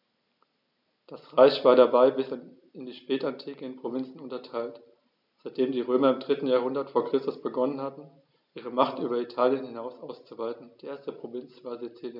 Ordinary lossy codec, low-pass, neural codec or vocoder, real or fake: none; 5.4 kHz; none; real